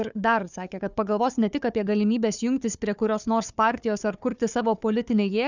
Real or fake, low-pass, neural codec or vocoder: fake; 7.2 kHz; codec, 16 kHz, 4 kbps, FunCodec, trained on Chinese and English, 50 frames a second